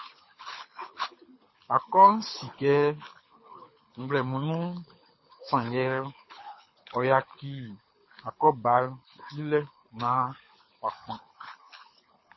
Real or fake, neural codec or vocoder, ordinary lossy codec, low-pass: fake; codec, 24 kHz, 6 kbps, HILCodec; MP3, 24 kbps; 7.2 kHz